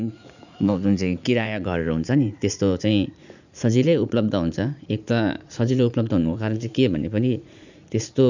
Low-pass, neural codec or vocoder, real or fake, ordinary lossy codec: 7.2 kHz; vocoder, 44.1 kHz, 80 mel bands, Vocos; fake; none